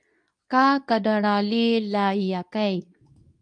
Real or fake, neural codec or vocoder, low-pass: fake; codec, 24 kHz, 0.9 kbps, WavTokenizer, medium speech release version 2; 9.9 kHz